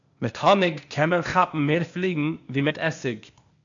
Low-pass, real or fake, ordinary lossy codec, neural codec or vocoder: 7.2 kHz; fake; MP3, 96 kbps; codec, 16 kHz, 0.8 kbps, ZipCodec